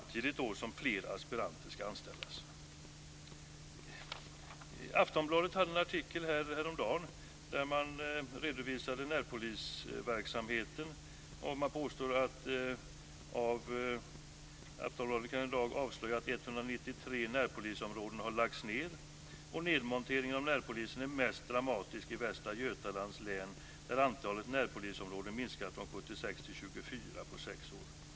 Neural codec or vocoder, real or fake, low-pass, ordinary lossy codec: none; real; none; none